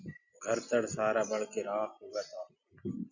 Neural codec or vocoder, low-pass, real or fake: none; 7.2 kHz; real